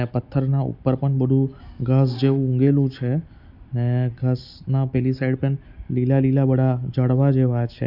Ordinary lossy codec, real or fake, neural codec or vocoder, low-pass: none; real; none; 5.4 kHz